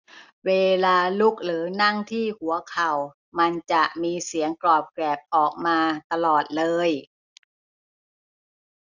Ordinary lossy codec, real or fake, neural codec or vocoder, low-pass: none; real; none; 7.2 kHz